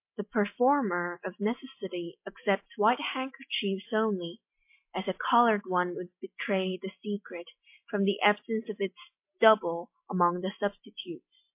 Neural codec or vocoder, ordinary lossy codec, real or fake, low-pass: none; MP3, 24 kbps; real; 3.6 kHz